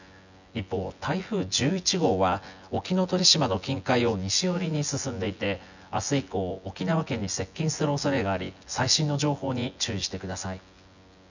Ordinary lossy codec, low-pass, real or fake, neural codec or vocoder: none; 7.2 kHz; fake; vocoder, 24 kHz, 100 mel bands, Vocos